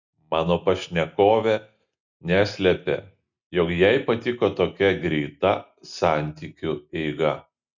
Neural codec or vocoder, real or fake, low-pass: none; real; 7.2 kHz